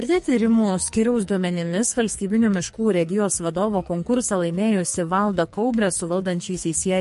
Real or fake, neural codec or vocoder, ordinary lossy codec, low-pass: fake; codec, 44.1 kHz, 2.6 kbps, SNAC; MP3, 48 kbps; 14.4 kHz